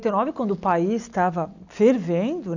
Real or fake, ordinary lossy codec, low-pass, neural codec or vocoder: real; none; 7.2 kHz; none